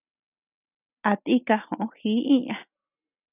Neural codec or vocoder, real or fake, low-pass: none; real; 3.6 kHz